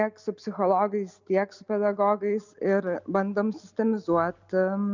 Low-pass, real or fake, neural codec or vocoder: 7.2 kHz; real; none